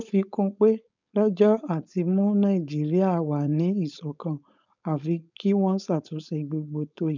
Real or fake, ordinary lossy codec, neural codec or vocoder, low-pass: fake; none; codec, 16 kHz, 4.8 kbps, FACodec; 7.2 kHz